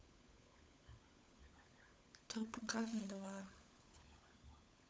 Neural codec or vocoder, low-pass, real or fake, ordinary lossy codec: codec, 16 kHz, 16 kbps, FunCodec, trained on LibriTTS, 50 frames a second; none; fake; none